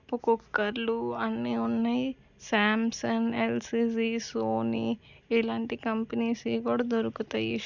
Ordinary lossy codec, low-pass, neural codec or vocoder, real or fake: none; 7.2 kHz; none; real